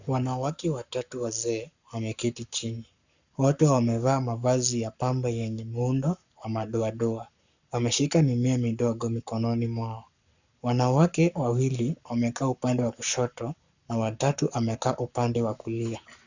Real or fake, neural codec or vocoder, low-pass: fake; codec, 44.1 kHz, 7.8 kbps, Pupu-Codec; 7.2 kHz